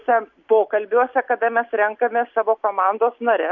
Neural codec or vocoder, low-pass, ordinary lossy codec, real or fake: none; 7.2 kHz; MP3, 48 kbps; real